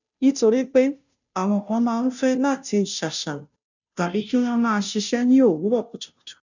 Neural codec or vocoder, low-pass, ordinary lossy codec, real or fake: codec, 16 kHz, 0.5 kbps, FunCodec, trained on Chinese and English, 25 frames a second; 7.2 kHz; none; fake